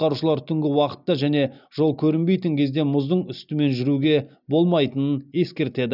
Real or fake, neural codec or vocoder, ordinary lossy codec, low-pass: real; none; none; 5.4 kHz